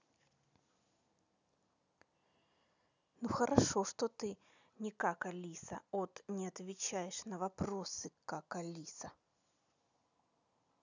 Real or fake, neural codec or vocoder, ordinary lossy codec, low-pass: real; none; none; 7.2 kHz